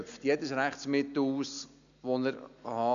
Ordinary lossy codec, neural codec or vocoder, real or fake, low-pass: none; none; real; 7.2 kHz